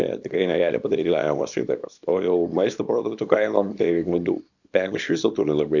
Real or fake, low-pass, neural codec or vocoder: fake; 7.2 kHz; codec, 24 kHz, 0.9 kbps, WavTokenizer, small release